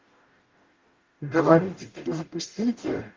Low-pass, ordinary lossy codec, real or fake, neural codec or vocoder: 7.2 kHz; Opus, 24 kbps; fake; codec, 44.1 kHz, 0.9 kbps, DAC